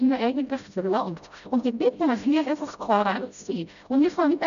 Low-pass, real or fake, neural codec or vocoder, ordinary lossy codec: 7.2 kHz; fake; codec, 16 kHz, 0.5 kbps, FreqCodec, smaller model; MP3, 96 kbps